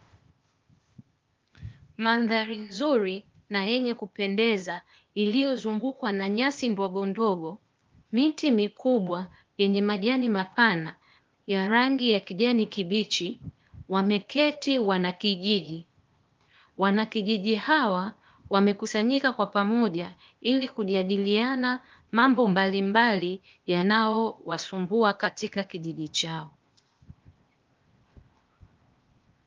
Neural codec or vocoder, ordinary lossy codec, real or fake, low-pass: codec, 16 kHz, 0.8 kbps, ZipCodec; Opus, 32 kbps; fake; 7.2 kHz